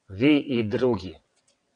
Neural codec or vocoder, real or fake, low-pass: vocoder, 22.05 kHz, 80 mel bands, WaveNeXt; fake; 9.9 kHz